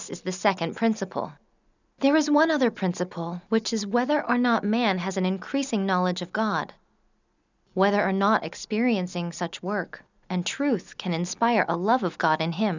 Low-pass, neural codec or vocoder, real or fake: 7.2 kHz; none; real